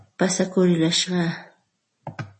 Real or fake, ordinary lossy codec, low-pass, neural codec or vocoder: real; MP3, 32 kbps; 10.8 kHz; none